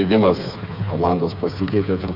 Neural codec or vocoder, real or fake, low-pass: codec, 16 kHz, 4 kbps, FreqCodec, smaller model; fake; 5.4 kHz